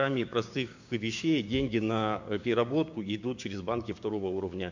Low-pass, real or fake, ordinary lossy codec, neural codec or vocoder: 7.2 kHz; fake; MP3, 48 kbps; codec, 16 kHz, 6 kbps, DAC